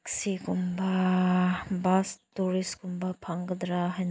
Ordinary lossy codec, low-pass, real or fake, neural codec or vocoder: none; none; real; none